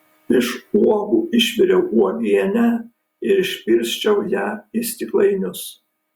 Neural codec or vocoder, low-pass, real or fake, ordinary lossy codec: none; 19.8 kHz; real; Opus, 64 kbps